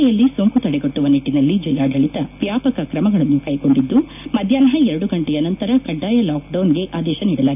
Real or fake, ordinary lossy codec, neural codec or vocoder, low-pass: real; none; none; 3.6 kHz